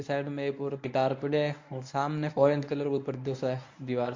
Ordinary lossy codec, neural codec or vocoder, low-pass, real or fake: MP3, 48 kbps; codec, 24 kHz, 0.9 kbps, WavTokenizer, medium speech release version 1; 7.2 kHz; fake